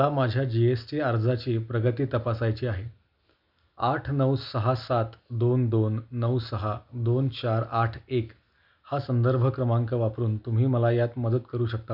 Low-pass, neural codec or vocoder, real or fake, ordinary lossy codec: 5.4 kHz; none; real; none